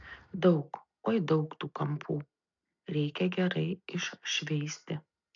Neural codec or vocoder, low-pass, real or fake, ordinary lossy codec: none; 7.2 kHz; real; MP3, 64 kbps